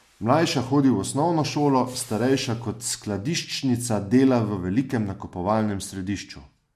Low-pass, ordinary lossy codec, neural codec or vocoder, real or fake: 14.4 kHz; MP3, 64 kbps; none; real